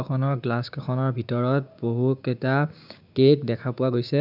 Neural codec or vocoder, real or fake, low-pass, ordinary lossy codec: codec, 16 kHz, 6 kbps, DAC; fake; 5.4 kHz; none